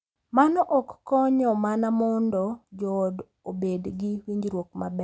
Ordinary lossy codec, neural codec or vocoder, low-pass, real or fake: none; none; none; real